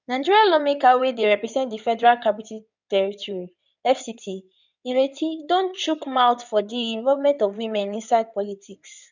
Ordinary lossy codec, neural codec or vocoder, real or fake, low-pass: none; codec, 16 kHz in and 24 kHz out, 2.2 kbps, FireRedTTS-2 codec; fake; 7.2 kHz